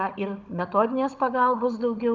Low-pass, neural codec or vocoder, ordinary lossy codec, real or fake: 7.2 kHz; codec, 16 kHz, 16 kbps, FunCodec, trained on Chinese and English, 50 frames a second; Opus, 24 kbps; fake